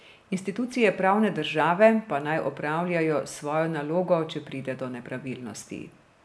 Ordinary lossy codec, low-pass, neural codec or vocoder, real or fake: none; none; none; real